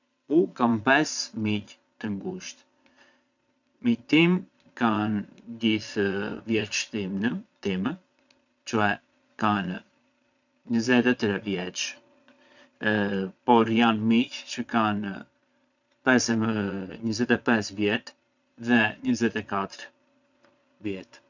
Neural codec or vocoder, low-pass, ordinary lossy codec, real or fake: vocoder, 22.05 kHz, 80 mel bands, Vocos; 7.2 kHz; none; fake